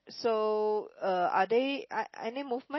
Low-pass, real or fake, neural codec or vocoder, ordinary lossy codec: 7.2 kHz; real; none; MP3, 24 kbps